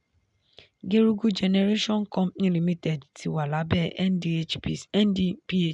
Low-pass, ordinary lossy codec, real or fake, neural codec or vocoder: 10.8 kHz; none; real; none